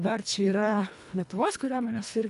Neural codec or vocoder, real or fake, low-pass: codec, 24 kHz, 1.5 kbps, HILCodec; fake; 10.8 kHz